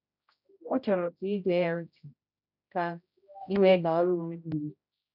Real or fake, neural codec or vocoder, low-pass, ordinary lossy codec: fake; codec, 16 kHz, 0.5 kbps, X-Codec, HuBERT features, trained on general audio; 5.4 kHz; none